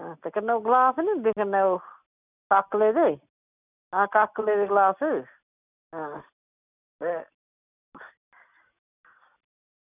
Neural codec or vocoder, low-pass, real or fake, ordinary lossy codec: none; 3.6 kHz; real; none